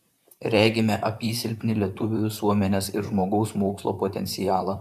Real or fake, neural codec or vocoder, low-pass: fake; vocoder, 44.1 kHz, 128 mel bands, Pupu-Vocoder; 14.4 kHz